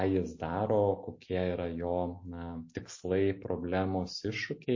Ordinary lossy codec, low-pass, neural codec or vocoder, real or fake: MP3, 32 kbps; 7.2 kHz; none; real